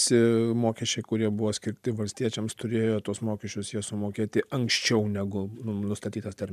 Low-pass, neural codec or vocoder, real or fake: 14.4 kHz; vocoder, 44.1 kHz, 128 mel bands every 512 samples, BigVGAN v2; fake